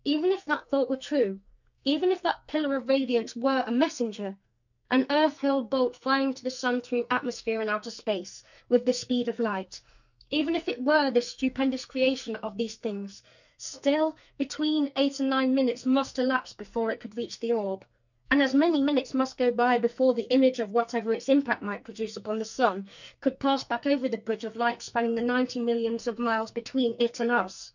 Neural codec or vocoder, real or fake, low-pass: codec, 44.1 kHz, 2.6 kbps, SNAC; fake; 7.2 kHz